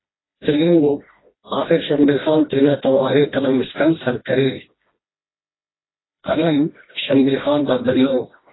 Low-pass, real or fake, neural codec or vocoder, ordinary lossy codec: 7.2 kHz; fake; codec, 16 kHz, 1 kbps, FreqCodec, smaller model; AAC, 16 kbps